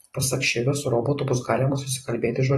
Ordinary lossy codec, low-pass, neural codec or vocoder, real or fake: AAC, 32 kbps; 19.8 kHz; vocoder, 44.1 kHz, 128 mel bands every 256 samples, BigVGAN v2; fake